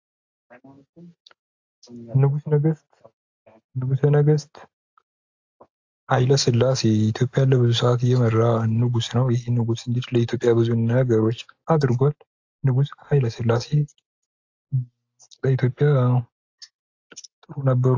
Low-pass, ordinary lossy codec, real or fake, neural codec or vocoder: 7.2 kHz; AAC, 48 kbps; real; none